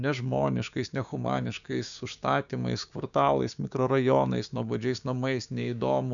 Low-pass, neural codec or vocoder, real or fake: 7.2 kHz; codec, 16 kHz, 6 kbps, DAC; fake